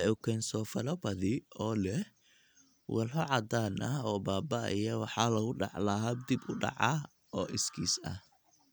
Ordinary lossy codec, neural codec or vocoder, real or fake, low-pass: none; none; real; none